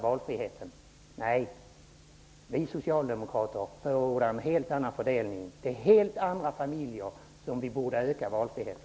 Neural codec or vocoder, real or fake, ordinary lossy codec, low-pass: none; real; none; none